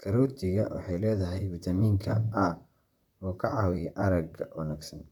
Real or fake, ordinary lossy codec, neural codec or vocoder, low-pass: fake; none; vocoder, 44.1 kHz, 128 mel bands, Pupu-Vocoder; 19.8 kHz